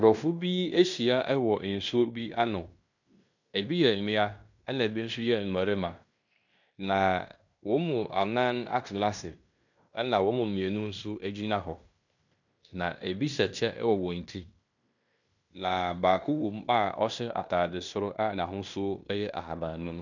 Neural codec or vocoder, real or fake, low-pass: codec, 16 kHz in and 24 kHz out, 0.9 kbps, LongCat-Audio-Codec, fine tuned four codebook decoder; fake; 7.2 kHz